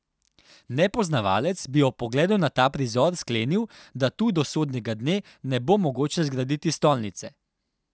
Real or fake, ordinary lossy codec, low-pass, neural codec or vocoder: real; none; none; none